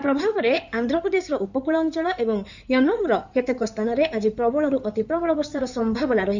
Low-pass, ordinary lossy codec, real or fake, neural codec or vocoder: 7.2 kHz; none; fake; codec, 16 kHz in and 24 kHz out, 2.2 kbps, FireRedTTS-2 codec